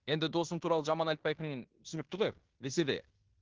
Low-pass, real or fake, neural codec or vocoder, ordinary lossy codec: 7.2 kHz; fake; codec, 16 kHz in and 24 kHz out, 0.9 kbps, LongCat-Audio-Codec, fine tuned four codebook decoder; Opus, 16 kbps